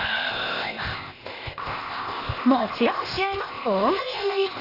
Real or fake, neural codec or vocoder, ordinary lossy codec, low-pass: fake; codec, 16 kHz, 0.8 kbps, ZipCodec; AAC, 24 kbps; 5.4 kHz